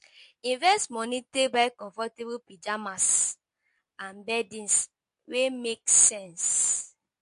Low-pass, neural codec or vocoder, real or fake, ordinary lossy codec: 14.4 kHz; none; real; MP3, 48 kbps